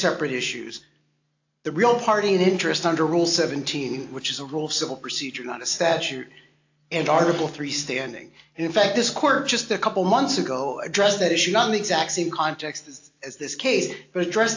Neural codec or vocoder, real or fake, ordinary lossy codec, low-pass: none; real; AAC, 48 kbps; 7.2 kHz